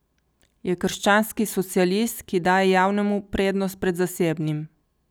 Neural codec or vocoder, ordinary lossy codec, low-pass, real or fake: none; none; none; real